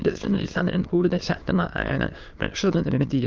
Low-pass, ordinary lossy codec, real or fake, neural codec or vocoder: 7.2 kHz; Opus, 24 kbps; fake; autoencoder, 22.05 kHz, a latent of 192 numbers a frame, VITS, trained on many speakers